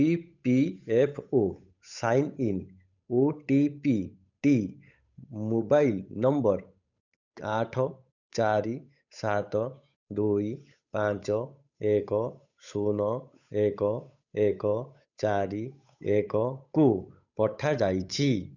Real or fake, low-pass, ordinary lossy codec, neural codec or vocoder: fake; 7.2 kHz; none; codec, 16 kHz, 8 kbps, FunCodec, trained on Chinese and English, 25 frames a second